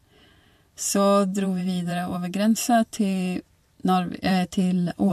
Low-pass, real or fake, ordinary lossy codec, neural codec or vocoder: 14.4 kHz; fake; MP3, 64 kbps; vocoder, 44.1 kHz, 128 mel bands every 512 samples, BigVGAN v2